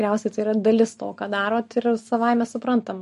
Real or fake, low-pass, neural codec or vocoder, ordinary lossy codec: real; 14.4 kHz; none; MP3, 48 kbps